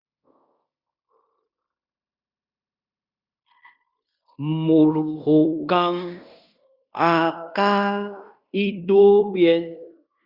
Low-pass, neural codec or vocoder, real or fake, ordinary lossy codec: 5.4 kHz; codec, 16 kHz in and 24 kHz out, 0.9 kbps, LongCat-Audio-Codec, fine tuned four codebook decoder; fake; Opus, 64 kbps